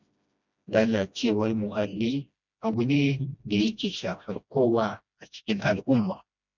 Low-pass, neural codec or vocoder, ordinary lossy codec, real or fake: 7.2 kHz; codec, 16 kHz, 1 kbps, FreqCodec, smaller model; none; fake